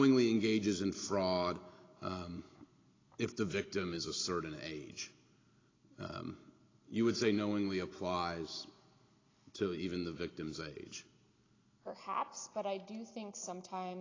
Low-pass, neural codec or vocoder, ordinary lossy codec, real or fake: 7.2 kHz; none; AAC, 32 kbps; real